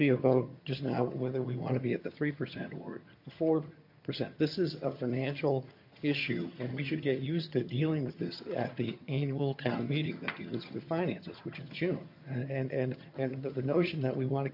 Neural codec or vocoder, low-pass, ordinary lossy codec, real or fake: vocoder, 22.05 kHz, 80 mel bands, HiFi-GAN; 5.4 kHz; MP3, 32 kbps; fake